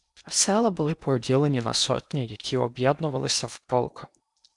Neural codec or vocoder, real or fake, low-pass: codec, 16 kHz in and 24 kHz out, 0.8 kbps, FocalCodec, streaming, 65536 codes; fake; 10.8 kHz